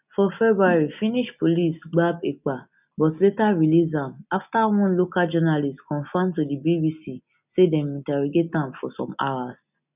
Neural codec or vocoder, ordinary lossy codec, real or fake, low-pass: none; none; real; 3.6 kHz